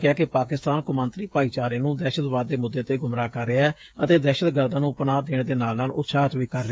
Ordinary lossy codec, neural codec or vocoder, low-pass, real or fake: none; codec, 16 kHz, 8 kbps, FreqCodec, smaller model; none; fake